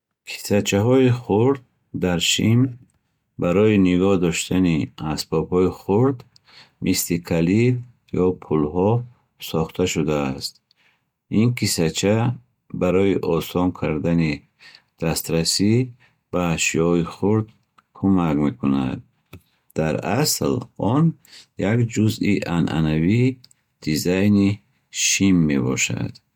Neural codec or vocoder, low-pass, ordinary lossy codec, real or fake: none; 19.8 kHz; MP3, 96 kbps; real